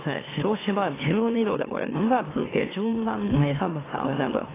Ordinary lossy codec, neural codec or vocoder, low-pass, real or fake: AAC, 16 kbps; autoencoder, 44.1 kHz, a latent of 192 numbers a frame, MeloTTS; 3.6 kHz; fake